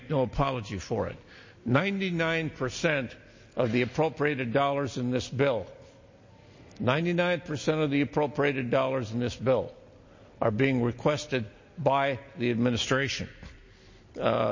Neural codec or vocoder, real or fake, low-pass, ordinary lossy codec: none; real; 7.2 kHz; MP3, 32 kbps